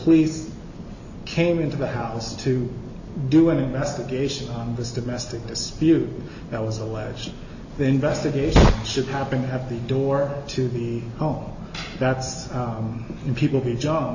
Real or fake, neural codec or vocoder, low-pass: real; none; 7.2 kHz